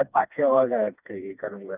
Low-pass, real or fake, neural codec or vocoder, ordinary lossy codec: 3.6 kHz; fake; codec, 16 kHz, 2 kbps, FreqCodec, smaller model; none